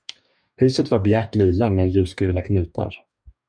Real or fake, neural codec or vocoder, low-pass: fake; codec, 44.1 kHz, 2.6 kbps, DAC; 9.9 kHz